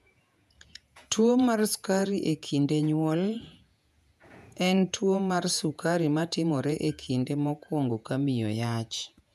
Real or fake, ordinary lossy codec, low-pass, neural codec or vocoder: fake; none; 14.4 kHz; vocoder, 48 kHz, 128 mel bands, Vocos